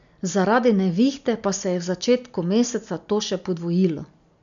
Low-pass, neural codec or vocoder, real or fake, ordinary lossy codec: 7.2 kHz; none; real; MP3, 96 kbps